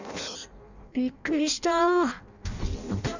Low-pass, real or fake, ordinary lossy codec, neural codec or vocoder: 7.2 kHz; fake; none; codec, 16 kHz in and 24 kHz out, 0.6 kbps, FireRedTTS-2 codec